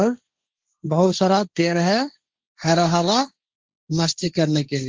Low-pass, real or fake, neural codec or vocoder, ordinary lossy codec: 7.2 kHz; fake; codec, 16 kHz, 1.1 kbps, Voila-Tokenizer; Opus, 32 kbps